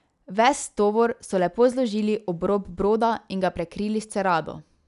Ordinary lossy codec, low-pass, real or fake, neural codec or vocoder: none; 10.8 kHz; real; none